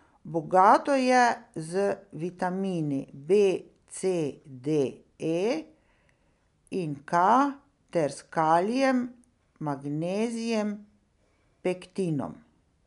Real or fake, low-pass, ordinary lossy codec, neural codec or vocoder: real; 10.8 kHz; MP3, 96 kbps; none